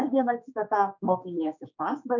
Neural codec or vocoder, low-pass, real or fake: codec, 16 kHz, 4 kbps, X-Codec, HuBERT features, trained on general audio; 7.2 kHz; fake